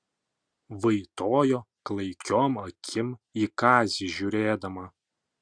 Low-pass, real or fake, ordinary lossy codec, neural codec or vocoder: 9.9 kHz; real; Opus, 64 kbps; none